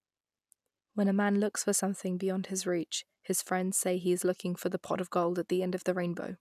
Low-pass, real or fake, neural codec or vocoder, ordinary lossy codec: 14.4 kHz; real; none; none